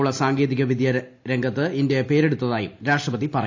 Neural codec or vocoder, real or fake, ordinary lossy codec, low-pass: none; real; AAC, 48 kbps; 7.2 kHz